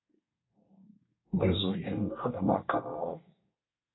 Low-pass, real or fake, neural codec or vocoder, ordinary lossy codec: 7.2 kHz; fake; codec, 24 kHz, 1 kbps, SNAC; AAC, 16 kbps